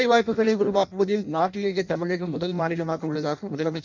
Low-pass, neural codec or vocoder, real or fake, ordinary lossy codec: 7.2 kHz; codec, 16 kHz in and 24 kHz out, 0.6 kbps, FireRedTTS-2 codec; fake; none